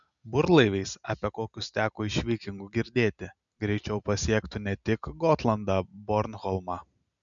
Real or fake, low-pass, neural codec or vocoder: real; 7.2 kHz; none